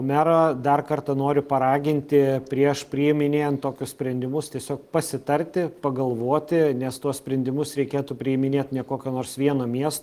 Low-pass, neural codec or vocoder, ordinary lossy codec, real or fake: 14.4 kHz; none; Opus, 24 kbps; real